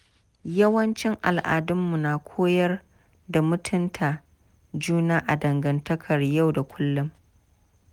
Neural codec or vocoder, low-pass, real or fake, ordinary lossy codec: none; 19.8 kHz; real; Opus, 32 kbps